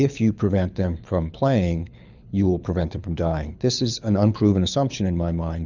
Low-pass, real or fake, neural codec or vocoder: 7.2 kHz; fake; codec, 24 kHz, 6 kbps, HILCodec